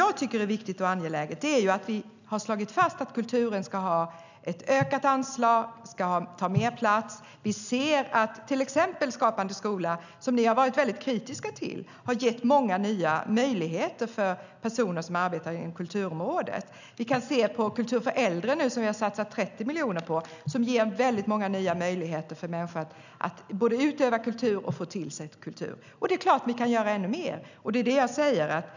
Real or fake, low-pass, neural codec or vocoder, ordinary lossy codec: real; 7.2 kHz; none; none